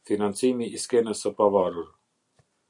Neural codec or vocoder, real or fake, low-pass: none; real; 10.8 kHz